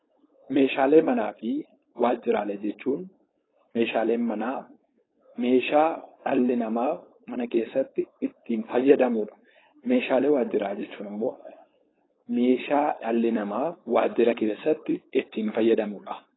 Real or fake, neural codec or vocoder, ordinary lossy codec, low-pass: fake; codec, 16 kHz, 4.8 kbps, FACodec; AAC, 16 kbps; 7.2 kHz